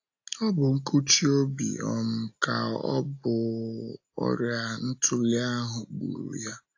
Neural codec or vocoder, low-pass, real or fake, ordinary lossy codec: none; 7.2 kHz; real; AAC, 48 kbps